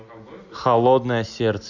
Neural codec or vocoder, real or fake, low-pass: none; real; 7.2 kHz